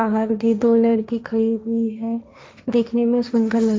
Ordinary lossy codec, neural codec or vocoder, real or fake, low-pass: none; codec, 16 kHz, 1.1 kbps, Voila-Tokenizer; fake; none